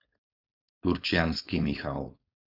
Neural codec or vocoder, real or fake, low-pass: codec, 16 kHz, 4.8 kbps, FACodec; fake; 5.4 kHz